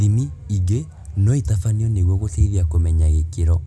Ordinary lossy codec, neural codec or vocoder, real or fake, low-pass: none; none; real; none